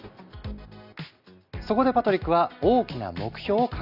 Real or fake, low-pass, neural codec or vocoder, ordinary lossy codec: real; 5.4 kHz; none; Opus, 64 kbps